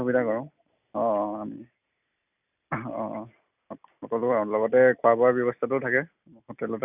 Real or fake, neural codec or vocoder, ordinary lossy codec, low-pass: real; none; none; 3.6 kHz